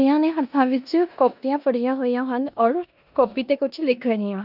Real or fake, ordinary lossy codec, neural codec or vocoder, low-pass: fake; none; codec, 16 kHz in and 24 kHz out, 0.9 kbps, LongCat-Audio-Codec, four codebook decoder; 5.4 kHz